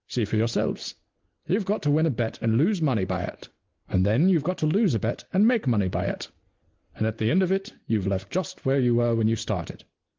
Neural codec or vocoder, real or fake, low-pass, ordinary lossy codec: none; real; 7.2 kHz; Opus, 16 kbps